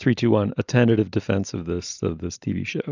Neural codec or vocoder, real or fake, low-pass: none; real; 7.2 kHz